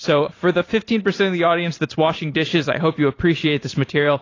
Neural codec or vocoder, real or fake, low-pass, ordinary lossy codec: vocoder, 44.1 kHz, 128 mel bands every 512 samples, BigVGAN v2; fake; 7.2 kHz; AAC, 32 kbps